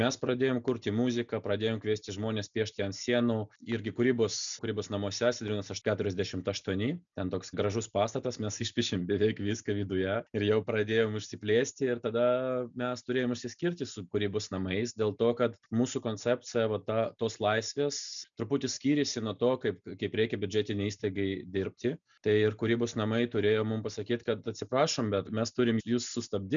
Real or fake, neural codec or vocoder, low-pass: real; none; 7.2 kHz